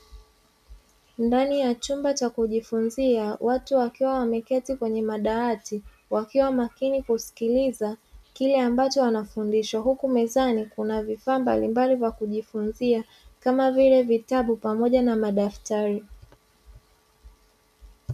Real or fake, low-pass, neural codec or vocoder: real; 14.4 kHz; none